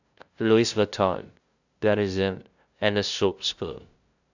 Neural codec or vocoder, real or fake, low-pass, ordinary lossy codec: codec, 16 kHz, 0.5 kbps, FunCodec, trained on LibriTTS, 25 frames a second; fake; 7.2 kHz; none